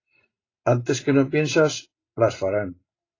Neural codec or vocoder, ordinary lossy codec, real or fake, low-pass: none; AAC, 32 kbps; real; 7.2 kHz